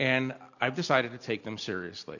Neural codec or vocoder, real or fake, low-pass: none; real; 7.2 kHz